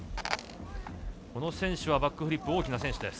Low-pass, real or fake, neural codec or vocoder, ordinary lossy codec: none; real; none; none